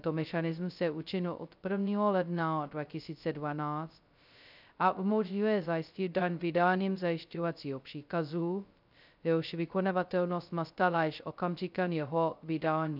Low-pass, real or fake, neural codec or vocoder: 5.4 kHz; fake; codec, 16 kHz, 0.2 kbps, FocalCodec